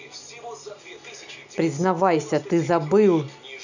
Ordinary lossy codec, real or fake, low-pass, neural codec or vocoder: none; real; 7.2 kHz; none